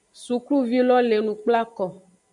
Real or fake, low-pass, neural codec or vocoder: real; 10.8 kHz; none